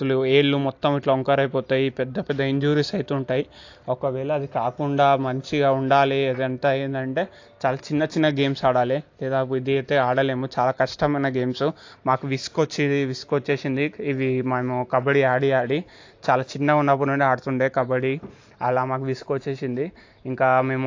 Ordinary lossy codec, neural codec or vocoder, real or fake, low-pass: AAC, 48 kbps; none; real; 7.2 kHz